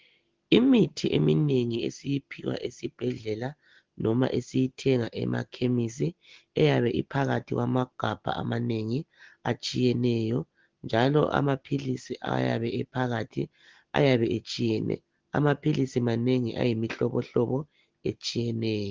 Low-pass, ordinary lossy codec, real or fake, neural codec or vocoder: 7.2 kHz; Opus, 16 kbps; real; none